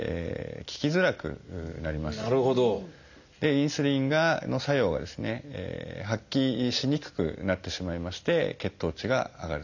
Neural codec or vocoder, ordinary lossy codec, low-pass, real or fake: none; none; 7.2 kHz; real